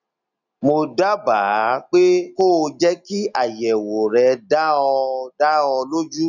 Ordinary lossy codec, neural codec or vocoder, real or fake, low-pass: none; none; real; 7.2 kHz